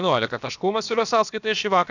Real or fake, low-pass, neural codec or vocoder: fake; 7.2 kHz; codec, 16 kHz, about 1 kbps, DyCAST, with the encoder's durations